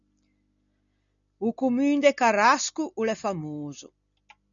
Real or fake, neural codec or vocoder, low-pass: real; none; 7.2 kHz